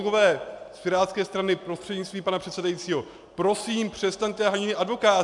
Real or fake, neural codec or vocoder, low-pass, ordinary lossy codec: real; none; 10.8 kHz; MP3, 96 kbps